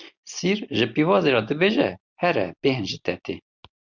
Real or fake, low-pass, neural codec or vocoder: real; 7.2 kHz; none